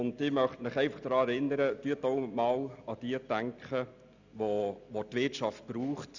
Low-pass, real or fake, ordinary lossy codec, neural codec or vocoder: 7.2 kHz; real; none; none